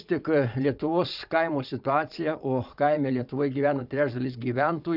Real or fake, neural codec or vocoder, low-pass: fake; vocoder, 22.05 kHz, 80 mel bands, Vocos; 5.4 kHz